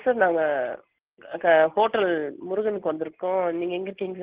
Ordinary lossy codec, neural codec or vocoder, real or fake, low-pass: Opus, 16 kbps; none; real; 3.6 kHz